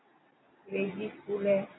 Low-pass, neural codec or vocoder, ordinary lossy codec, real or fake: 7.2 kHz; none; AAC, 16 kbps; real